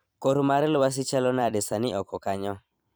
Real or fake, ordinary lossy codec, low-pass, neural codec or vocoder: fake; none; none; vocoder, 44.1 kHz, 128 mel bands every 256 samples, BigVGAN v2